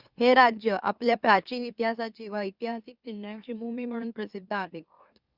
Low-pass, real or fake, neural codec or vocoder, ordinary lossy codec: 5.4 kHz; fake; autoencoder, 44.1 kHz, a latent of 192 numbers a frame, MeloTTS; Opus, 64 kbps